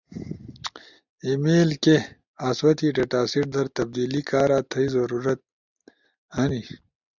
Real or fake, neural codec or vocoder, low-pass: real; none; 7.2 kHz